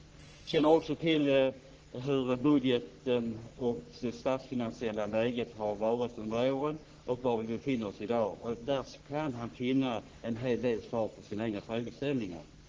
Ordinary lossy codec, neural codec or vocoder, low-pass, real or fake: Opus, 16 kbps; codec, 44.1 kHz, 3.4 kbps, Pupu-Codec; 7.2 kHz; fake